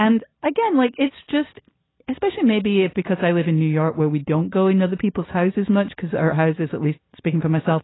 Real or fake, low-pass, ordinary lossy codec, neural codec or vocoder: real; 7.2 kHz; AAC, 16 kbps; none